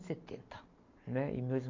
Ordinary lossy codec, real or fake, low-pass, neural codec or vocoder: MP3, 48 kbps; real; 7.2 kHz; none